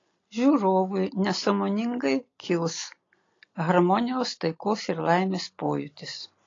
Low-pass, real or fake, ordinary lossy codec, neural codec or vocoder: 7.2 kHz; real; AAC, 32 kbps; none